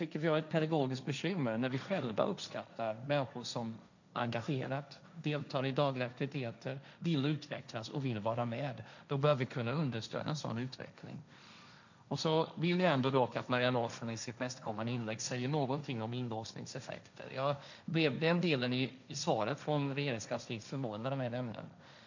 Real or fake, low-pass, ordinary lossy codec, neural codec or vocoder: fake; 7.2 kHz; none; codec, 16 kHz, 1.1 kbps, Voila-Tokenizer